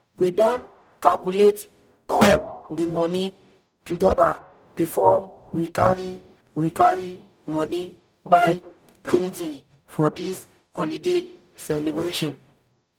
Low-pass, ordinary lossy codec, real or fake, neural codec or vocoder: 19.8 kHz; none; fake; codec, 44.1 kHz, 0.9 kbps, DAC